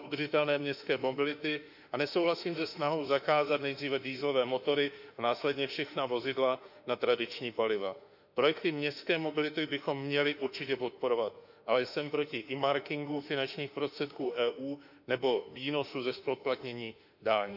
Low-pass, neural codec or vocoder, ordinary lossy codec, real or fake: 5.4 kHz; autoencoder, 48 kHz, 32 numbers a frame, DAC-VAE, trained on Japanese speech; none; fake